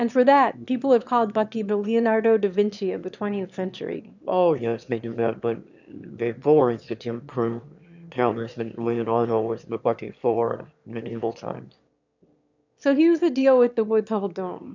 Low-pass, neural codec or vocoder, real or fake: 7.2 kHz; autoencoder, 22.05 kHz, a latent of 192 numbers a frame, VITS, trained on one speaker; fake